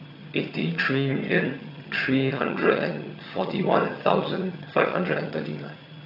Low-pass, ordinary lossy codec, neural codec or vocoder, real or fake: 5.4 kHz; AAC, 48 kbps; vocoder, 22.05 kHz, 80 mel bands, HiFi-GAN; fake